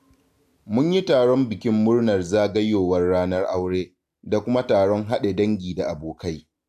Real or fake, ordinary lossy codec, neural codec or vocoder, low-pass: real; AAC, 96 kbps; none; 14.4 kHz